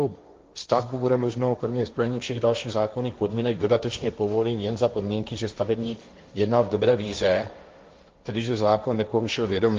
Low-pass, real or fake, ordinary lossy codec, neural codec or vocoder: 7.2 kHz; fake; Opus, 24 kbps; codec, 16 kHz, 1.1 kbps, Voila-Tokenizer